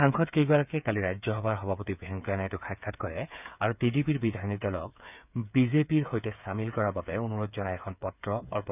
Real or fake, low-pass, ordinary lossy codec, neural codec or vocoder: fake; 3.6 kHz; none; codec, 16 kHz, 8 kbps, FreqCodec, smaller model